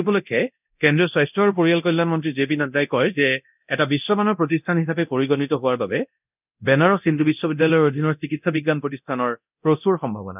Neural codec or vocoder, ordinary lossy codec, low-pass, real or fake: codec, 24 kHz, 0.9 kbps, DualCodec; none; 3.6 kHz; fake